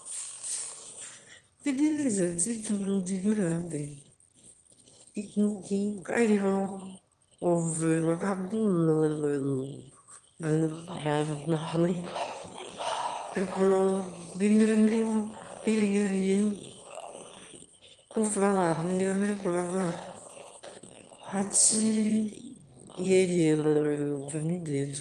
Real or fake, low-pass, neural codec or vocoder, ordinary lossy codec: fake; 9.9 kHz; autoencoder, 22.05 kHz, a latent of 192 numbers a frame, VITS, trained on one speaker; Opus, 24 kbps